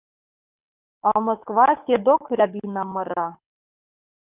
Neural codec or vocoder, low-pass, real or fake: codec, 24 kHz, 6 kbps, HILCodec; 3.6 kHz; fake